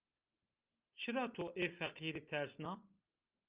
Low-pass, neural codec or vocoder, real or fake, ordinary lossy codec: 3.6 kHz; none; real; Opus, 24 kbps